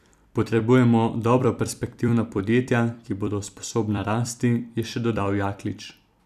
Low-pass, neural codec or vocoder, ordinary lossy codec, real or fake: 14.4 kHz; vocoder, 44.1 kHz, 128 mel bands every 256 samples, BigVGAN v2; none; fake